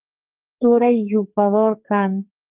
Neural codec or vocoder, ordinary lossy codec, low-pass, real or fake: codec, 44.1 kHz, 2.6 kbps, SNAC; Opus, 24 kbps; 3.6 kHz; fake